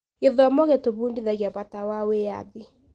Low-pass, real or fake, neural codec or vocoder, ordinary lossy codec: 9.9 kHz; real; none; Opus, 16 kbps